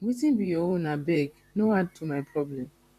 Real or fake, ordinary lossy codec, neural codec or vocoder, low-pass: fake; MP3, 96 kbps; vocoder, 44.1 kHz, 128 mel bands, Pupu-Vocoder; 14.4 kHz